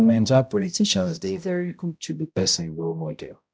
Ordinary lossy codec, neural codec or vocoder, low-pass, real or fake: none; codec, 16 kHz, 0.5 kbps, X-Codec, HuBERT features, trained on balanced general audio; none; fake